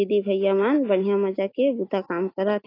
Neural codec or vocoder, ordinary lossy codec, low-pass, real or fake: autoencoder, 48 kHz, 128 numbers a frame, DAC-VAE, trained on Japanese speech; AAC, 24 kbps; 5.4 kHz; fake